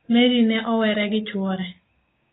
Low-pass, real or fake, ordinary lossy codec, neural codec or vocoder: 7.2 kHz; fake; AAC, 16 kbps; codec, 16 kHz, 6 kbps, DAC